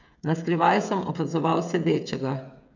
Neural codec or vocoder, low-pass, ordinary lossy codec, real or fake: codec, 16 kHz, 8 kbps, FreqCodec, smaller model; 7.2 kHz; none; fake